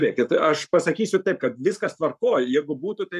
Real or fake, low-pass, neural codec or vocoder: fake; 14.4 kHz; codec, 44.1 kHz, 7.8 kbps, Pupu-Codec